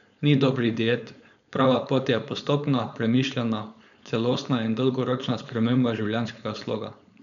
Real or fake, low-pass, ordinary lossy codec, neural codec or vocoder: fake; 7.2 kHz; none; codec, 16 kHz, 4.8 kbps, FACodec